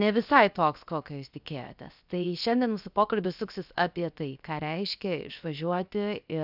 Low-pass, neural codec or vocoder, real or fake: 5.4 kHz; codec, 16 kHz, 0.7 kbps, FocalCodec; fake